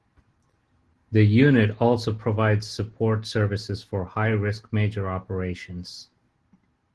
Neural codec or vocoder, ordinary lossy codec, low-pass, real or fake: none; Opus, 16 kbps; 10.8 kHz; real